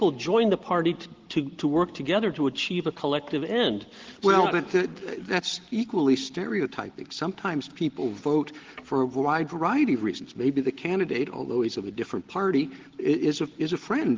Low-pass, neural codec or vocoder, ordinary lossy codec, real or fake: 7.2 kHz; none; Opus, 16 kbps; real